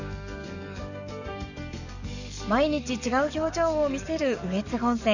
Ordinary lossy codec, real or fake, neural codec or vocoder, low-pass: none; fake; codec, 44.1 kHz, 7.8 kbps, DAC; 7.2 kHz